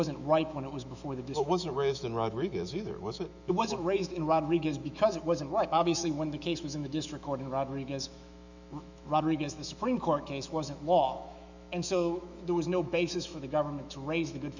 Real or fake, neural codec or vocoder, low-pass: fake; autoencoder, 48 kHz, 128 numbers a frame, DAC-VAE, trained on Japanese speech; 7.2 kHz